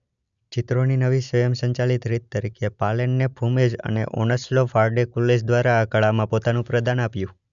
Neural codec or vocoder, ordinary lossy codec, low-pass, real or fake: none; none; 7.2 kHz; real